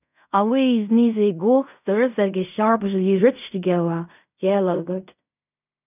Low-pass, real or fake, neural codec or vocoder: 3.6 kHz; fake; codec, 16 kHz in and 24 kHz out, 0.4 kbps, LongCat-Audio-Codec, fine tuned four codebook decoder